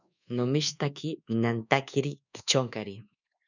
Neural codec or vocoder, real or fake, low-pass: codec, 24 kHz, 1.2 kbps, DualCodec; fake; 7.2 kHz